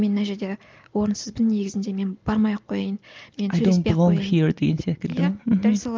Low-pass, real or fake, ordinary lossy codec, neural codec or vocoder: 7.2 kHz; real; Opus, 24 kbps; none